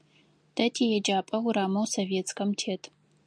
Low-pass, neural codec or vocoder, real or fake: 9.9 kHz; none; real